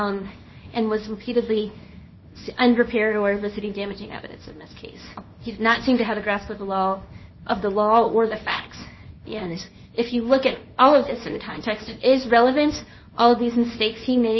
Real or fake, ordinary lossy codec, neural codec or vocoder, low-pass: fake; MP3, 24 kbps; codec, 24 kHz, 0.9 kbps, WavTokenizer, small release; 7.2 kHz